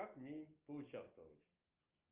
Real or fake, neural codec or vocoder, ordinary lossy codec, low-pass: real; none; Opus, 24 kbps; 3.6 kHz